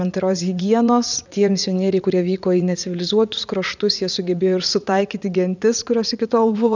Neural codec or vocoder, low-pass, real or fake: vocoder, 44.1 kHz, 80 mel bands, Vocos; 7.2 kHz; fake